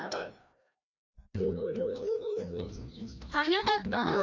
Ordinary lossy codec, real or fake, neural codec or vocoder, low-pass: none; fake; codec, 16 kHz, 1 kbps, FreqCodec, larger model; 7.2 kHz